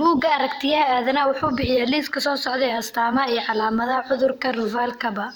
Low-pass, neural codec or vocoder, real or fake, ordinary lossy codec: none; vocoder, 44.1 kHz, 128 mel bands every 512 samples, BigVGAN v2; fake; none